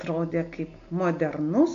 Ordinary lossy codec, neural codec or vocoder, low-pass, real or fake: AAC, 48 kbps; none; 7.2 kHz; real